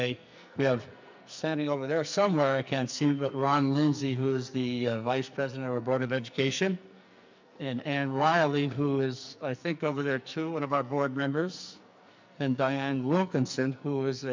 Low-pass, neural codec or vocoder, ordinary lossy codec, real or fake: 7.2 kHz; codec, 44.1 kHz, 2.6 kbps, SNAC; MP3, 64 kbps; fake